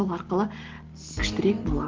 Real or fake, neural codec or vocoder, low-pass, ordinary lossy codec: real; none; 7.2 kHz; Opus, 16 kbps